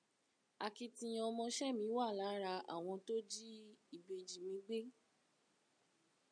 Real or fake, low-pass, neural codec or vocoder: real; 9.9 kHz; none